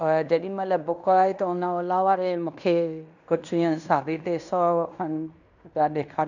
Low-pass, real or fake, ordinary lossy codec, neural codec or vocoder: 7.2 kHz; fake; none; codec, 16 kHz in and 24 kHz out, 0.9 kbps, LongCat-Audio-Codec, fine tuned four codebook decoder